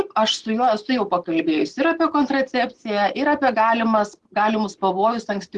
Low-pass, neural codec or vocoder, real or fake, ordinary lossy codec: 10.8 kHz; none; real; Opus, 16 kbps